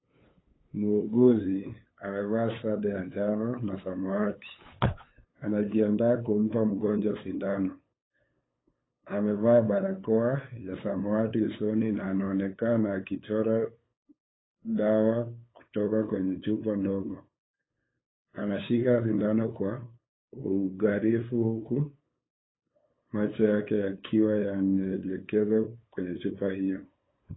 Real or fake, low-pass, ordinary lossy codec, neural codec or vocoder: fake; 7.2 kHz; AAC, 16 kbps; codec, 16 kHz, 8 kbps, FunCodec, trained on LibriTTS, 25 frames a second